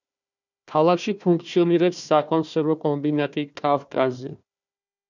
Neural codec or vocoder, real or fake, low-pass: codec, 16 kHz, 1 kbps, FunCodec, trained on Chinese and English, 50 frames a second; fake; 7.2 kHz